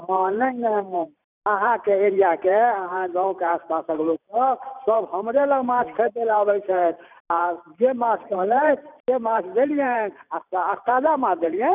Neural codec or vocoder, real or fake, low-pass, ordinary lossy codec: vocoder, 44.1 kHz, 128 mel bands every 512 samples, BigVGAN v2; fake; 3.6 kHz; none